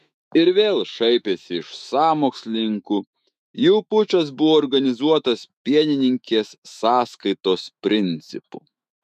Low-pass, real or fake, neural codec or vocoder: 14.4 kHz; fake; autoencoder, 48 kHz, 128 numbers a frame, DAC-VAE, trained on Japanese speech